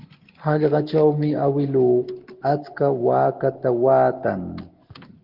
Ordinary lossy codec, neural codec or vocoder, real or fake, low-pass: Opus, 16 kbps; none; real; 5.4 kHz